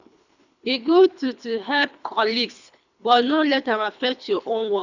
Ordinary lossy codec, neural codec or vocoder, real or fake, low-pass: none; codec, 24 kHz, 3 kbps, HILCodec; fake; 7.2 kHz